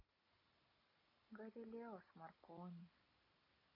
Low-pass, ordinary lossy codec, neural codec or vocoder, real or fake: 5.4 kHz; MP3, 32 kbps; none; real